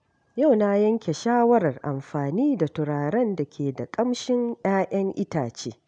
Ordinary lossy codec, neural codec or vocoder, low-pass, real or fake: none; none; 9.9 kHz; real